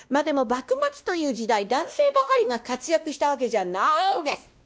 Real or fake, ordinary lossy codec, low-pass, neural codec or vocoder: fake; none; none; codec, 16 kHz, 1 kbps, X-Codec, WavLM features, trained on Multilingual LibriSpeech